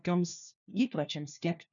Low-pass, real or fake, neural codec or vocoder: 7.2 kHz; fake; codec, 16 kHz, 1 kbps, FunCodec, trained on LibriTTS, 50 frames a second